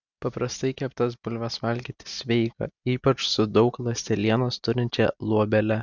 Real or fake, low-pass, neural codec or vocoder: real; 7.2 kHz; none